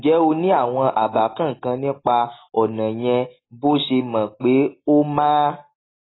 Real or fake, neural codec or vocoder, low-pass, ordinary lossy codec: real; none; 7.2 kHz; AAC, 16 kbps